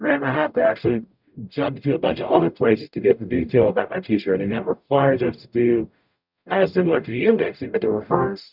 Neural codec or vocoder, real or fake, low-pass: codec, 44.1 kHz, 0.9 kbps, DAC; fake; 5.4 kHz